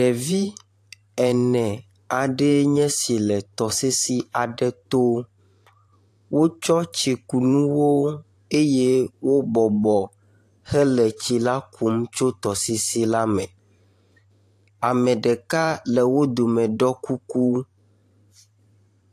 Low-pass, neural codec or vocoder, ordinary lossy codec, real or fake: 14.4 kHz; vocoder, 44.1 kHz, 128 mel bands every 512 samples, BigVGAN v2; AAC, 64 kbps; fake